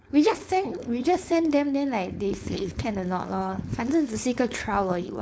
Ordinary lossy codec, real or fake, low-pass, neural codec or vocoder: none; fake; none; codec, 16 kHz, 4.8 kbps, FACodec